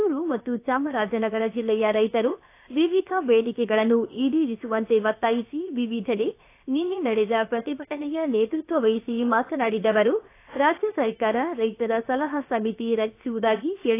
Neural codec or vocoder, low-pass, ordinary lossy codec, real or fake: codec, 16 kHz, about 1 kbps, DyCAST, with the encoder's durations; 3.6 kHz; AAC, 24 kbps; fake